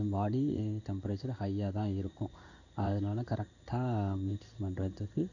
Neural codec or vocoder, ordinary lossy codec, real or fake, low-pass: codec, 16 kHz in and 24 kHz out, 1 kbps, XY-Tokenizer; none; fake; 7.2 kHz